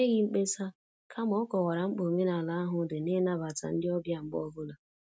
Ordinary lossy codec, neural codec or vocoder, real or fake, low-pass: none; none; real; none